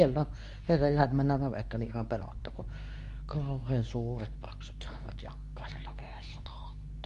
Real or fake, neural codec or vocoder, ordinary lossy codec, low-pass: fake; codec, 24 kHz, 0.9 kbps, WavTokenizer, medium speech release version 2; none; 10.8 kHz